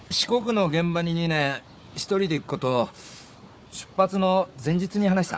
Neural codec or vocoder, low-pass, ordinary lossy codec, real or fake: codec, 16 kHz, 4 kbps, FunCodec, trained on Chinese and English, 50 frames a second; none; none; fake